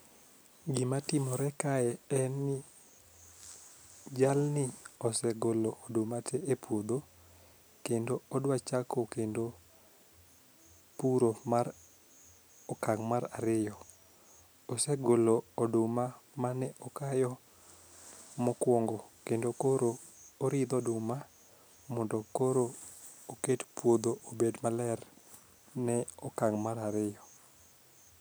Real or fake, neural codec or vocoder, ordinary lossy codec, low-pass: real; none; none; none